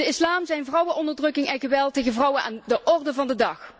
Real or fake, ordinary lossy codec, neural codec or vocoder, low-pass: real; none; none; none